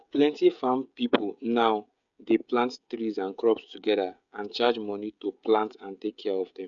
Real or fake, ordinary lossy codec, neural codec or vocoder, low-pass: fake; none; codec, 16 kHz, 16 kbps, FreqCodec, smaller model; 7.2 kHz